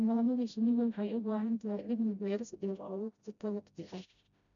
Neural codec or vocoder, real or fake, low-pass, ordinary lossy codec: codec, 16 kHz, 0.5 kbps, FreqCodec, smaller model; fake; 7.2 kHz; none